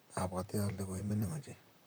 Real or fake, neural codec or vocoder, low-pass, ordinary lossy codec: fake; vocoder, 44.1 kHz, 128 mel bands, Pupu-Vocoder; none; none